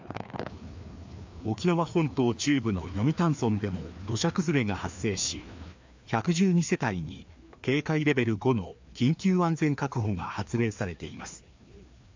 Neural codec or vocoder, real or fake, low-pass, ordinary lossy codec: codec, 16 kHz, 2 kbps, FreqCodec, larger model; fake; 7.2 kHz; MP3, 64 kbps